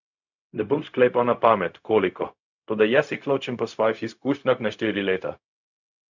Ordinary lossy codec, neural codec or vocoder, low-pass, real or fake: none; codec, 16 kHz, 0.4 kbps, LongCat-Audio-Codec; 7.2 kHz; fake